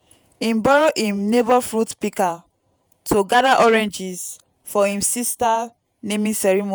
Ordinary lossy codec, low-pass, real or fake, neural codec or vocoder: none; none; fake; vocoder, 48 kHz, 128 mel bands, Vocos